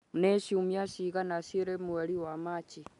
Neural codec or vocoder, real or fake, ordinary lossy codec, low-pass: none; real; none; 10.8 kHz